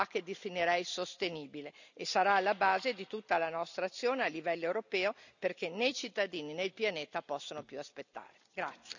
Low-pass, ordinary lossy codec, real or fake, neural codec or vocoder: 7.2 kHz; none; real; none